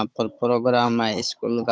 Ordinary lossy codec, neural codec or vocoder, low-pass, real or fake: none; codec, 16 kHz, 16 kbps, FunCodec, trained on Chinese and English, 50 frames a second; none; fake